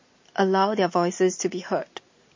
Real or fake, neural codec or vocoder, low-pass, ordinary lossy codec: real; none; 7.2 kHz; MP3, 32 kbps